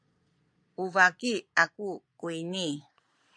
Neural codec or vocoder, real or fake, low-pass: vocoder, 22.05 kHz, 80 mel bands, Vocos; fake; 9.9 kHz